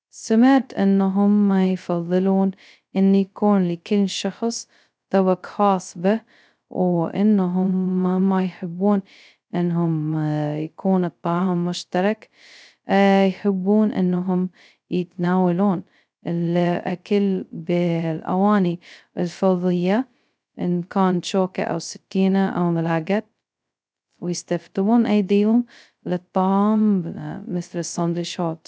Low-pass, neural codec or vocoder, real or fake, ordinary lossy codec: none; codec, 16 kHz, 0.2 kbps, FocalCodec; fake; none